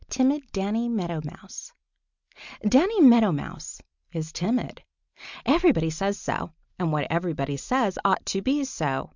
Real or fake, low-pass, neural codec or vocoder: real; 7.2 kHz; none